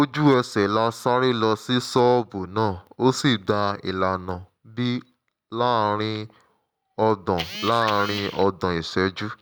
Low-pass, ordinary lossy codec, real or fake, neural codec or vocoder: none; none; real; none